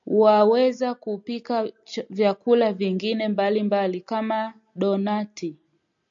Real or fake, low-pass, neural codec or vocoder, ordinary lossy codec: real; 7.2 kHz; none; AAC, 64 kbps